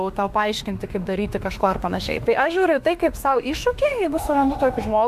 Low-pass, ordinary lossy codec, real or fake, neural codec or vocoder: 14.4 kHz; AAC, 96 kbps; fake; autoencoder, 48 kHz, 32 numbers a frame, DAC-VAE, trained on Japanese speech